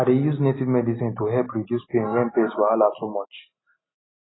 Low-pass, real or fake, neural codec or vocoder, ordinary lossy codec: 7.2 kHz; real; none; AAC, 16 kbps